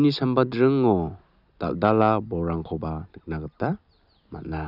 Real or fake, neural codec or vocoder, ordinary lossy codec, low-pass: real; none; none; 5.4 kHz